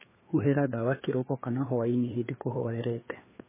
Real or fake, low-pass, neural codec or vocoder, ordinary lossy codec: fake; 3.6 kHz; codec, 16 kHz, 2 kbps, FreqCodec, larger model; MP3, 16 kbps